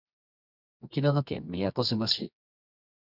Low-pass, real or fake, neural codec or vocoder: 5.4 kHz; fake; codec, 24 kHz, 0.9 kbps, WavTokenizer, medium music audio release